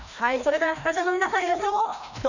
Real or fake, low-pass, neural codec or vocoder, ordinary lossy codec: fake; 7.2 kHz; codec, 16 kHz, 1 kbps, FreqCodec, larger model; none